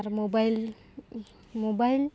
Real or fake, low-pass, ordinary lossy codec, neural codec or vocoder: real; none; none; none